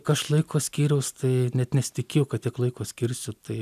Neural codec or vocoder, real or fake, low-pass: none; real; 14.4 kHz